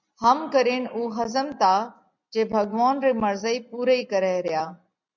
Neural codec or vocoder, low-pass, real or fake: none; 7.2 kHz; real